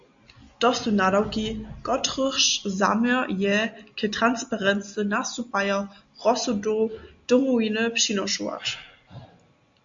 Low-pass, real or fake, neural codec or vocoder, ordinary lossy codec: 7.2 kHz; real; none; Opus, 64 kbps